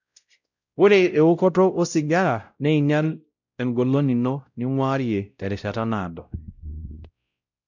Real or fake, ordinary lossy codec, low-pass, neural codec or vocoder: fake; none; 7.2 kHz; codec, 16 kHz, 0.5 kbps, X-Codec, WavLM features, trained on Multilingual LibriSpeech